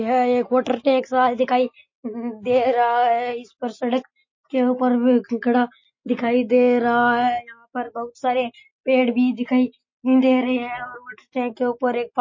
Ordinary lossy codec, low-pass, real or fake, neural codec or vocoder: MP3, 32 kbps; 7.2 kHz; real; none